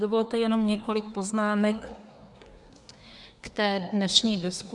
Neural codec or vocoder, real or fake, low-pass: codec, 24 kHz, 1 kbps, SNAC; fake; 10.8 kHz